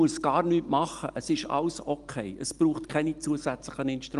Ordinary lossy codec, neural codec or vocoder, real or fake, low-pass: Opus, 32 kbps; none; real; 10.8 kHz